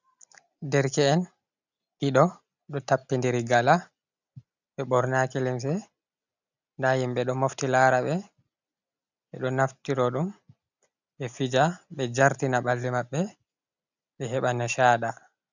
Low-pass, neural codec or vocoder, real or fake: 7.2 kHz; none; real